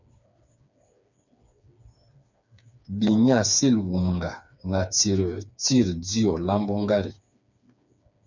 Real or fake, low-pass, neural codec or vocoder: fake; 7.2 kHz; codec, 16 kHz, 4 kbps, FreqCodec, smaller model